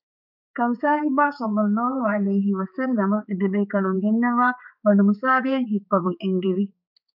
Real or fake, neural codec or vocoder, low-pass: fake; codec, 16 kHz, 4 kbps, X-Codec, HuBERT features, trained on balanced general audio; 5.4 kHz